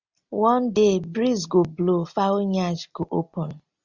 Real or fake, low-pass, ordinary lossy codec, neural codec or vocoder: real; 7.2 kHz; Opus, 64 kbps; none